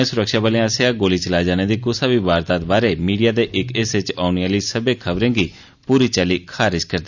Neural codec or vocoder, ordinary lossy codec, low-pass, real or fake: none; none; 7.2 kHz; real